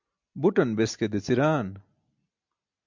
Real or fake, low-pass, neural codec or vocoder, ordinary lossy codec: real; 7.2 kHz; none; MP3, 64 kbps